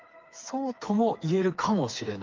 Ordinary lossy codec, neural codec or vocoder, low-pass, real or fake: Opus, 32 kbps; codec, 16 kHz, 4 kbps, FreqCodec, smaller model; 7.2 kHz; fake